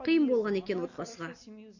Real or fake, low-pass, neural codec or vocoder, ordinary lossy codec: real; 7.2 kHz; none; none